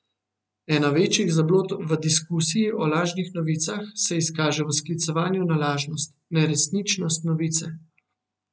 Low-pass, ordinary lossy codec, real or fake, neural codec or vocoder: none; none; real; none